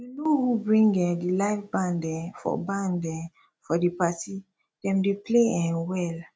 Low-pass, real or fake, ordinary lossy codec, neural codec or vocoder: none; real; none; none